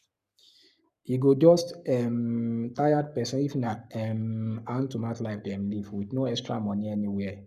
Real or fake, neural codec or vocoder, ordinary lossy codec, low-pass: fake; codec, 44.1 kHz, 7.8 kbps, Pupu-Codec; none; 14.4 kHz